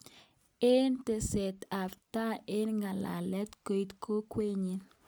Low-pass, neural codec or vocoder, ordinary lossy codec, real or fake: none; none; none; real